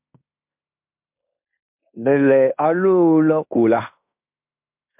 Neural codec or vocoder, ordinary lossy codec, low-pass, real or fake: codec, 16 kHz in and 24 kHz out, 0.9 kbps, LongCat-Audio-Codec, fine tuned four codebook decoder; MP3, 32 kbps; 3.6 kHz; fake